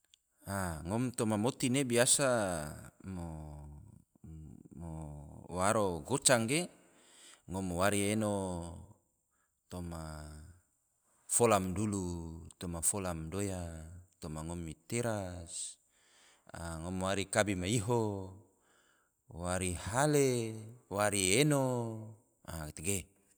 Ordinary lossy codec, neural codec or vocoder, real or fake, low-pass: none; none; real; none